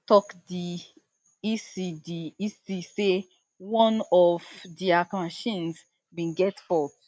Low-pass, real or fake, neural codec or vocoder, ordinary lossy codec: none; real; none; none